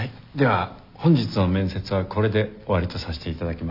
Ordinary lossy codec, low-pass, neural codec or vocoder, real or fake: none; 5.4 kHz; none; real